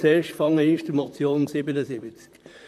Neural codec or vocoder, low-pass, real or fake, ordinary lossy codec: vocoder, 44.1 kHz, 128 mel bands, Pupu-Vocoder; 14.4 kHz; fake; none